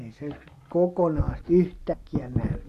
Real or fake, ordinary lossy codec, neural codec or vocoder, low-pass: real; AAC, 64 kbps; none; 14.4 kHz